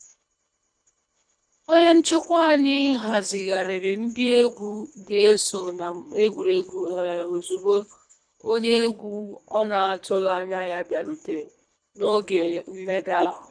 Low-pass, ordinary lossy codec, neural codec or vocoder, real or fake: 9.9 kHz; MP3, 96 kbps; codec, 24 kHz, 1.5 kbps, HILCodec; fake